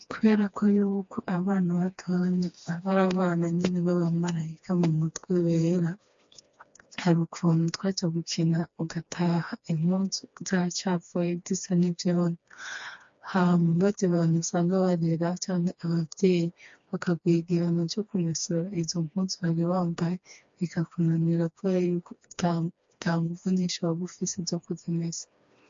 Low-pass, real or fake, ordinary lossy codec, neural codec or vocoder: 7.2 kHz; fake; MP3, 48 kbps; codec, 16 kHz, 2 kbps, FreqCodec, smaller model